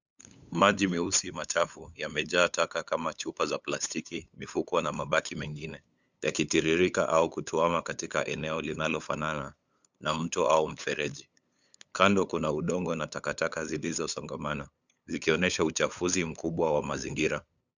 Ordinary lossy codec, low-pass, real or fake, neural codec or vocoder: Opus, 64 kbps; 7.2 kHz; fake; codec, 16 kHz, 8 kbps, FunCodec, trained on LibriTTS, 25 frames a second